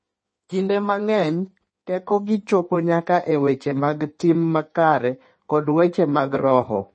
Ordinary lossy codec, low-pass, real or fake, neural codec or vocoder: MP3, 32 kbps; 9.9 kHz; fake; codec, 16 kHz in and 24 kHz out, 1.1 kbps, FireRedTTS-2 codec